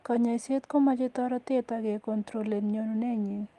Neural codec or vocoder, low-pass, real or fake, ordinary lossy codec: none; 10.8 kHz; real; Opus, 24 kbps